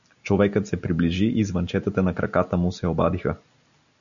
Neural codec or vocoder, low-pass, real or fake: none; 7.2 kHz; real